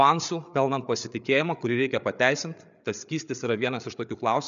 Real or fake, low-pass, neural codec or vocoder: fake; 7.2 kHz; codec, 16 kHz, 8 kbps, FreqCodec, larger model